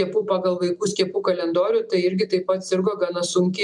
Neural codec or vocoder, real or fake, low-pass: none; real; 10.8 kHz